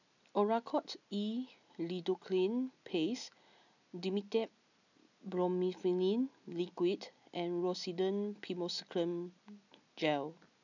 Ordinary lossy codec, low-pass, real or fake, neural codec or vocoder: none; 7.2 kHz; real; none